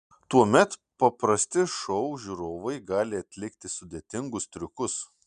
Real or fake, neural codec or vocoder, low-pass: real; none; 9.9 kHz